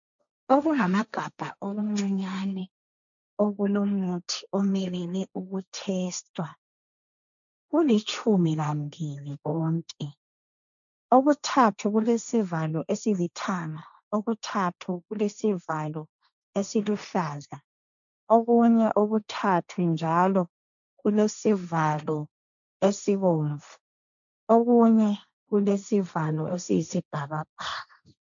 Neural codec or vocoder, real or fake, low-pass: codec, 16 kHz, 1.1 kbps, Voila-Tokenizer; fake; 7.2 kHz